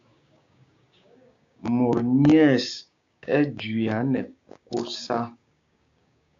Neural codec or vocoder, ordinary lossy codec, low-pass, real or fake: codec, 16 kHz, 6 kbps, DAC; AAC, 64 kbps; 7.2 kHz; fake